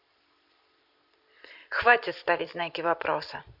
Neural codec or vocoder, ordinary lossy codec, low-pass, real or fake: vocoder, 44.1 kHz, 128 mel bands every 256 samples, BigVGAN v2; none; 5.4 kHz; fake